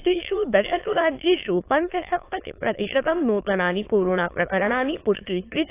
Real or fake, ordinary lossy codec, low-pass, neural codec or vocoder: fake; AAC, 24 kbps; 3.6 kHz; autoencoder, 22.05 kHz, a latent of 192 numbers a frame, VITS, trained on many speakers